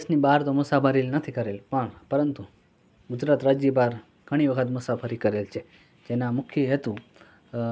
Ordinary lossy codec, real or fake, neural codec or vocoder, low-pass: none; real; none; none